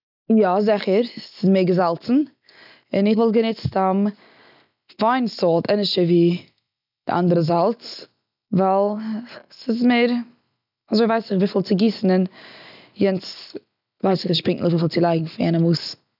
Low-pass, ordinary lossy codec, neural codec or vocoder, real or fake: 5.4 kHz; none; none; real